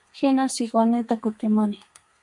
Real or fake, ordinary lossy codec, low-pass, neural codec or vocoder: fake; MP3, 64 kbps; 10.8 kHz; codec, 32 kHz, 1.9 kbps, SNAC